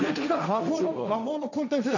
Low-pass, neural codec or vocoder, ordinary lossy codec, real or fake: 7.2 kHz; codec, 16 kHz, 1.1 kbps, Voila-Tokenizer; none; fake